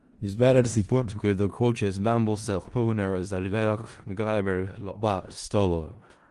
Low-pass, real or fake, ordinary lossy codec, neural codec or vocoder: 10.8 kHz; fake; Opus, 24 kbps; codec, 16 kHz in and 24 kHz out, 0.4 kbps, LongCat-Audio-Codec, four codebook decoder